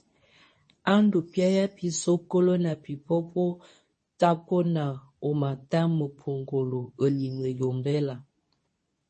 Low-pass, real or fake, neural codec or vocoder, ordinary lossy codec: 10.8 kHz; fake; codec, 24 kHz, 0.9 kbps, WavTokenizer, medium speech release version 2; MP3, 32 kbps